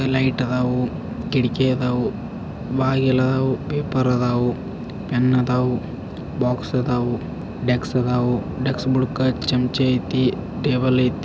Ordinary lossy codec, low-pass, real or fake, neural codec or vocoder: none; none; real; none